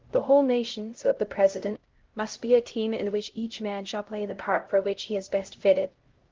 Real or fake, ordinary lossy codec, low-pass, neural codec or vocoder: fake; Opus, 16 kbps; 7.2 kHz; codec, 16 kHz, 0.5 kbps, X-Codec, HuBERT features, trained on LibriSpeech